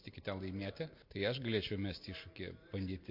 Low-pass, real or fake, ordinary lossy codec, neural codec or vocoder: 5.4 kHz; real; MP3, 32 kbps; none